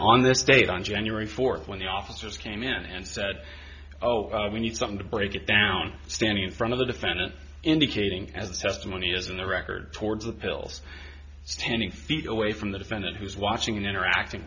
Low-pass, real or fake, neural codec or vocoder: 7.2 kHz; real; none